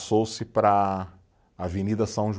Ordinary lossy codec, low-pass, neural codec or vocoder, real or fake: none; none; none; real